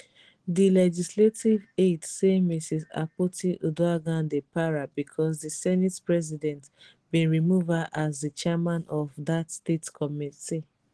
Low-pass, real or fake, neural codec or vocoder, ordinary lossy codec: 10.8 kHz; real; none; Opus, 16 kbps